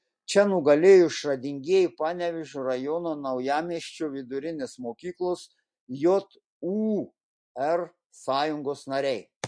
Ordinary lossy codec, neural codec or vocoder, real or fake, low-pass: MP3, 48 kbps; none; real; 9.9 kHz